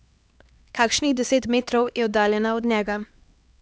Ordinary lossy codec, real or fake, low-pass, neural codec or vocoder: none; fake; none; codec, 16 kHz, 2 kbps, X-Codec, HuBERT features, trained on LibriSpeech